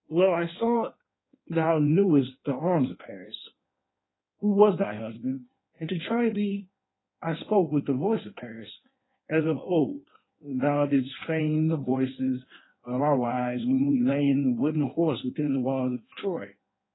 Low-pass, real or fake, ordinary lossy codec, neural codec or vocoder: 7.2 kHz; fake; AAC, 16 kbps; codec, 16 kHz in and 24 kHz out, 1.1 kbps, FireRedTTS-2 codec